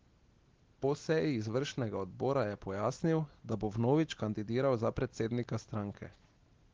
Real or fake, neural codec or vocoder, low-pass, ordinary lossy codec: real; none; 7.2 kHz; Opus, 16 kbps